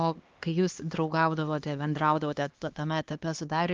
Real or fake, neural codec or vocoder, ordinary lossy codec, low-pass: fake; codec, 16 kHz, 2 kbps, X-Codec, HuBERT features, trained on LibriSpeech; Opus, 32 kbps; 7.2 kHz